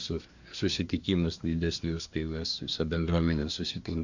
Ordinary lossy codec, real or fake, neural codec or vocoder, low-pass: Opus, 64 kbps; fake; codec, 24 kHz, 1 kbps, SNAC; 7.2 kHz